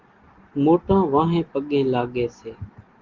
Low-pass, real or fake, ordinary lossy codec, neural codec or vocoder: 7.2 kHz; real; Opus, 16 kbps; none